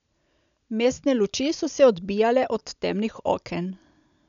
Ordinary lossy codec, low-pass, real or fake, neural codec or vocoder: none; 7.2 kHz; real; none